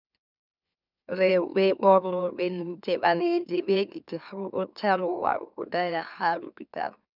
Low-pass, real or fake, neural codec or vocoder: 5.4 kHz; fake; autoencoder, 44.1 kHz, a latent of 192 numbers a frame, MeloTTS